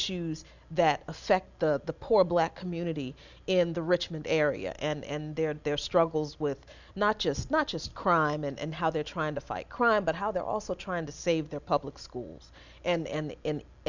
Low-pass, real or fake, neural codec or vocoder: 7.2 kHz; real; none